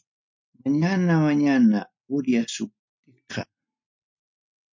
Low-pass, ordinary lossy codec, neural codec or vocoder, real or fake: 7.2 kHz; MP3, 48 kbps; none; real